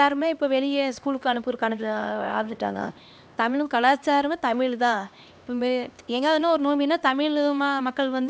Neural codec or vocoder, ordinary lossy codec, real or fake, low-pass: codec, 16 kHz, 2 kbps, X-Codec, HuBERT features, trained on LibriSpeech; none; fake; none